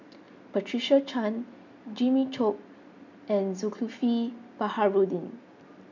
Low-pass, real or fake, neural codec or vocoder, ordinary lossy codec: 7.2 kHz; real; none; MP3, 64 kbps